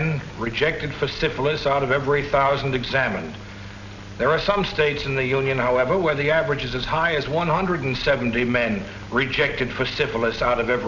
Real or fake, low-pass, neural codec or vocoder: real; 7.2 kHz; none